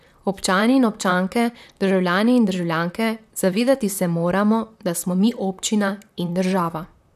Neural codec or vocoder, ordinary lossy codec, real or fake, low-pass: vocoder, 44.1 kHz, 128 mel bands, Pupu-Vocoder; none; fake; 14.4 kHz